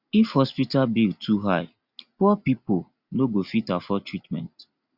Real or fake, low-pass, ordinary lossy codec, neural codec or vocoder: real; 5.4 kHz; Opus, 64 kbps; none